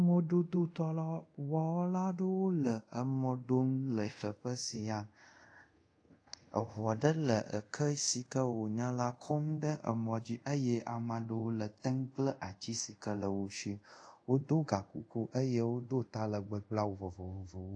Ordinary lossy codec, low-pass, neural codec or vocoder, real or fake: AAC, 48 kbps; 9.9 kHz; codec, 24 kHz, 0.5 kbps, DualCodec; fake